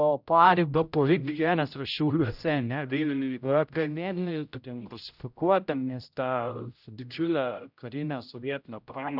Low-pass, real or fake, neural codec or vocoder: 5.4 kHz; fake; codec, 16 kHz, 0.5 kbps, X-Codec, HuBERT features, trained on general audio